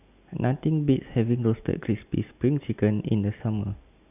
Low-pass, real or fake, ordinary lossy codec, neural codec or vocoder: 3.6 kHz; real; none; none